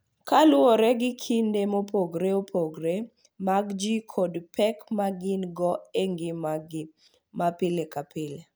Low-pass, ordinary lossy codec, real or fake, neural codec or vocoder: none; none; real; none